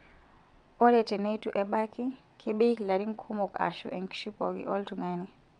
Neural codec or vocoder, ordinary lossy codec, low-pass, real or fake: vocoder, 22.05 kHz, 80 mel bands, WaveNeXt; none; 9.9 kHz; fake